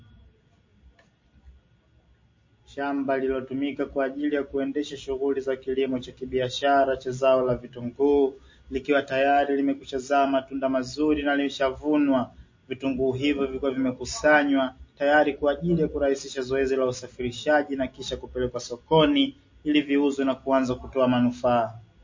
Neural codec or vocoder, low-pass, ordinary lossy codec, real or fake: none; 7.2 kHz; MP3, 32 kbps; real